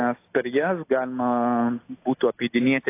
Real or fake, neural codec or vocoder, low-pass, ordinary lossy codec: real; none; 3.6 kHz; AAC, 24 kbps